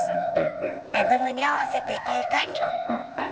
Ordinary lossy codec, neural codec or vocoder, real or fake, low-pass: none; codec, 16 kHz, 0.8 kbps, ZipCodec; fake; none